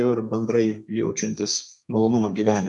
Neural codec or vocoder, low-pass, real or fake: codec, 32 kHz, 1.9 kbps, SNAC; 10.8 kHz; fake